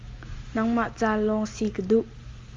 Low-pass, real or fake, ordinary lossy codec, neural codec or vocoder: 7.2 kHz; real; Opus, 32 kbps; none